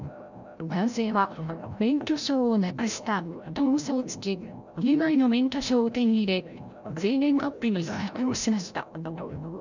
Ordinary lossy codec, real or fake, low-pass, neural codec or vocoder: none; fake; 7.2 kHz; codec, 16 kHz, 0.5 kbps, FreqCodec, larger model